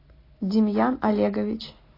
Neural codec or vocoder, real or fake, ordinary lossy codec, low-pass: none; real; AAC, 24 kbps; 5.4 kHz